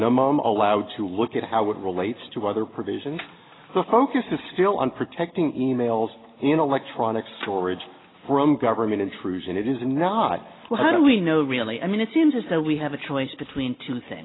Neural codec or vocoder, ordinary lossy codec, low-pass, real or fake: none; AAC, 16 kbps; 7.2 kHz; real